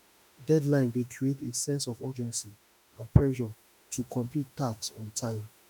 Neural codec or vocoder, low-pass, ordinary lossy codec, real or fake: autoencoder, 48 kHz, 32 numbers a frame, DAC-VAE, trained on Japanese speech; none; none; fake